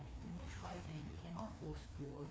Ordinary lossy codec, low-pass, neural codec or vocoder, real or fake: none; none; codec, 16 kHz, 2 kbps, FreqCodec, larger model; fake